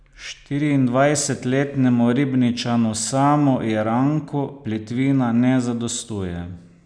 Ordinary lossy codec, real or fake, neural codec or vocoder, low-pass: none; real; none; 9.9 kHz